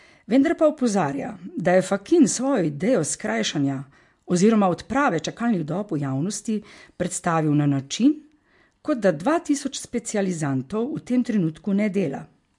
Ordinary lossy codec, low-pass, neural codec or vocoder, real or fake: MP3, 64 kbps; 10.8 kHz; none; real